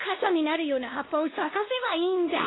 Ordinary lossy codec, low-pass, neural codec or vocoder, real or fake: AAC, 16 kbps; 7.2 kHz; codec, 16 kHz, 1 kbps, X-Codec, WavLM features, trained on Multilingual LibriSpeech; fake